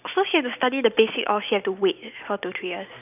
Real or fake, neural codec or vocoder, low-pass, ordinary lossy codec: real; none; 3.6 kHz; none